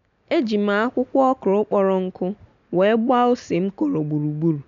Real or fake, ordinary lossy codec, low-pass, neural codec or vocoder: real; none; 7.2 kHz; none